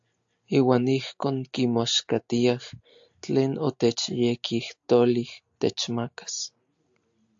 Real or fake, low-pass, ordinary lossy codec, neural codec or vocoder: real; 7.2 kHz; AAC, 64 kbps; none